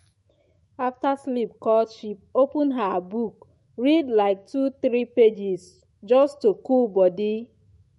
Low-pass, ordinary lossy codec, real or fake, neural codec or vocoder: 19.8 kHz; MP3, 48 kbps; fake; autoencoder, 48 kHz, 128 numbers a frame, DAC-VAE, trained on Japanese speech